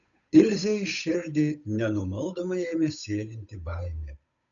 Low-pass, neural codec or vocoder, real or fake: 7.2 kHz; codec, 16 kHz, 8 kbps, FunCodec, trained on Chinese and English, 25 frames a second; fake